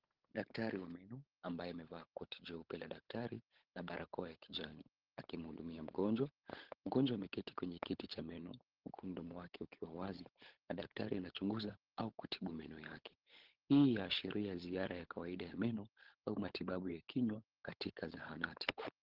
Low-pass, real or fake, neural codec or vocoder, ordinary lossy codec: 5.4 kHz; fake; codec, 16 kHz, 16 kbps, FunCodec, trained on LibriTTS, 50 frames a second; Opus, 16 kbps